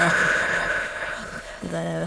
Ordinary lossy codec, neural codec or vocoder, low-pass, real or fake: none; autoencoder, 22.05 kHz, a latent of 192 numbers a frame, VITS, trained on many speakers; none; fake